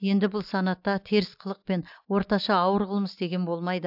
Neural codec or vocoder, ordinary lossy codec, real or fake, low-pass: none; AAC, 48 kbps; real; 5.4 kHz